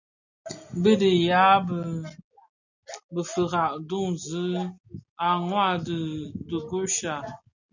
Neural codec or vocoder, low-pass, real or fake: none; 7.2 kHz; real